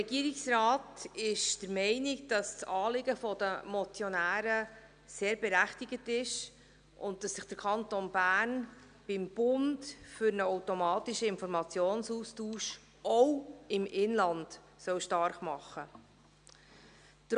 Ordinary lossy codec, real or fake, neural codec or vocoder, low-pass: none; real; none; 9.9 kHz